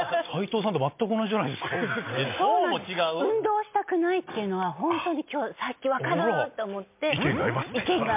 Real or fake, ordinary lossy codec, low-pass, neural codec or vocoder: real; MP3, 32 kbps; 3.6 kHz; none